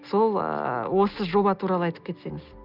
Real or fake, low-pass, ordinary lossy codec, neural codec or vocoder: real; 5.4 kHz; Opus, 24 kbps; none